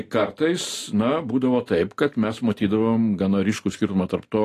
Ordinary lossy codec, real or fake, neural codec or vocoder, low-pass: AAC, 48 kbps; real; none; 14.4 kHz